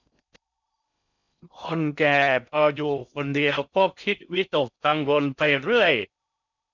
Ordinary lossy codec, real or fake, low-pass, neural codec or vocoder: none; fake; 7.2 kHz; codec, 16 kHz in and 24 kHz out, 0.6 kbps, FocalCodec, streaming, 4096 codes